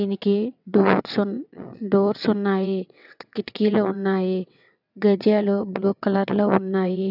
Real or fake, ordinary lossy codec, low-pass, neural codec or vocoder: fake; none; 5.4 kHz; vocoder, 22.05 kHz, 80 mel bands, WaveNeXt